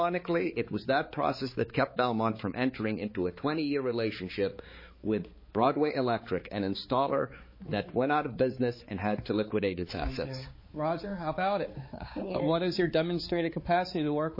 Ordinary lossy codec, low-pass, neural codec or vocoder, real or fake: MP3, 24 kbps; 5.4 kHz; codec, 16 kHz, 4 kbps, X-Codec, HuBERT features, trained on balanced general audio; fake